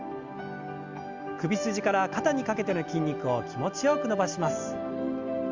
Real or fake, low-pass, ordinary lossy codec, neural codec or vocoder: real; 7.2 kHz; Opus, 32 kbps; none